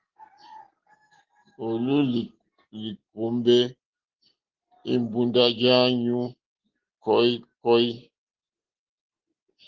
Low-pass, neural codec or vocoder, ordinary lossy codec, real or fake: 7.2 kHz; none; Opus, 16 kbps; real